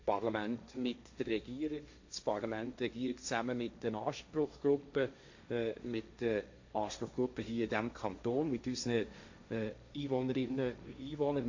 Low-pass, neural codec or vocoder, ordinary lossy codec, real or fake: 7.2 kHz; codec, 16 kHz, 1.1 kbps, Voila-Tokenizer; AAC, 48 kbps; fake